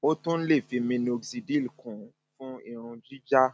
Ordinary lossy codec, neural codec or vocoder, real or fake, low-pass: none; none; real; none